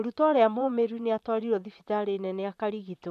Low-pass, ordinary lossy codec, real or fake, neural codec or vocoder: 14.4 kHz; none; fake; vocoder, 44.1 kHz, 128 mel bands, Pupu-Vocoder